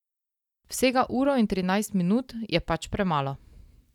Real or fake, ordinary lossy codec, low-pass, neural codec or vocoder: real; none; 19.8 kHz; none